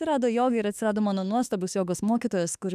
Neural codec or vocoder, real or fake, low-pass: autoencoder, 48 kHz, 32 numbers a frame, DAC-VAE, trained on Japanese speech; fake; 14.4 kHz